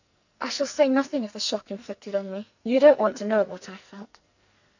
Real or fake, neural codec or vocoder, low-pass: fake; codec, 44.1 kHz, 2.6 kbps, SNAC; 7.2 kHz